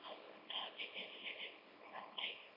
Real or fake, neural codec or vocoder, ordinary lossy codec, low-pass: fake; codec, 24 kHz, 0.9 kbps, WavTokenizer, small release; none; 5.4 kHz